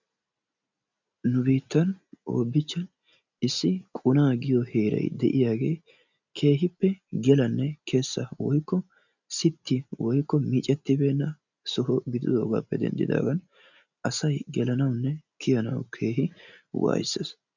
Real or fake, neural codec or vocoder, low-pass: real; none; 7.2 kHz